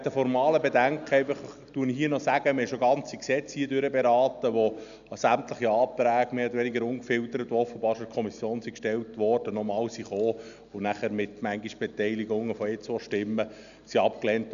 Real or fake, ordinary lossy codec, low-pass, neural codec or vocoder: real; none; 7.2 kHz; none